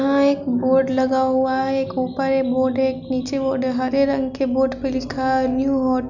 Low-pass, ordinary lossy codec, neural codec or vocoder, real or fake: 7.2 kHz; none; none; real